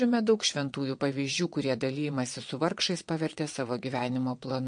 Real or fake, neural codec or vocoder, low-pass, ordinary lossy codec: fake; vocoder, 22.05 kHz, 80 mel bands, WaveNeXt; 9.9 kHz; MP3, 48 kbps